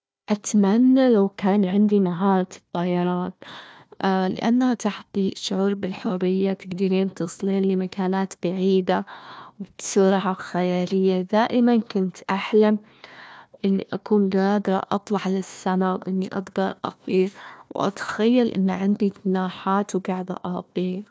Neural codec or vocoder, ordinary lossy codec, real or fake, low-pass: codec, 16 kHz, 1 kbps, FunCodec, trained on Chinese and English, 50 frames a second; none; fake; none